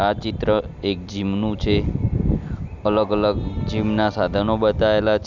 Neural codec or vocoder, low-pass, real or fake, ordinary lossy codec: none; 7.2 kHz; real; none